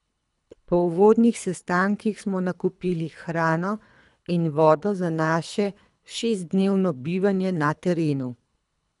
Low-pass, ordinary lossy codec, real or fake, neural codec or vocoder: 10.8 kHz; none; fake; codec, 24 kHz, 3 kbps, HILCodec